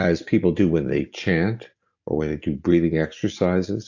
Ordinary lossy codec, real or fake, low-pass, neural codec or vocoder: AAC, 48 kbps; real; 7.2 kHz; none